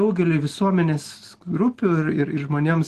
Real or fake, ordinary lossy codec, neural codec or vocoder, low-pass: real; Opus, 16 kbps; none; 14.4 kHz